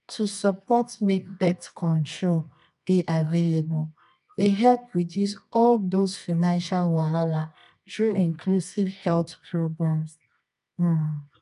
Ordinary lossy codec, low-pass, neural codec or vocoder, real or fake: none; 10.8 kHz; codec, 24 kHz, 0.9 kbps, WavTokenizer, medium music audio release; fake